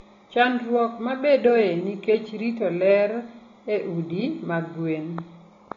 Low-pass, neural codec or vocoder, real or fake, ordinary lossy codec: 7.2 kHz; none; real; AAC, 32 kbps